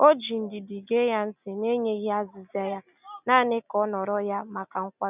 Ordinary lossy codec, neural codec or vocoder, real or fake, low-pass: none; none; real; 3.6 kHz